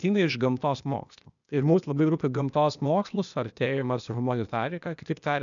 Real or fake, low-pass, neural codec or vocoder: fake; 7.2 kHz; codec, 16 kHz, 0.8 kbps, ZipCodec